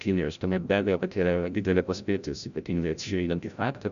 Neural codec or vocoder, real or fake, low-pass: codec, 16 kHz, 0.5 kbps, FreqCodec, larger model; fake; 7.2 kHz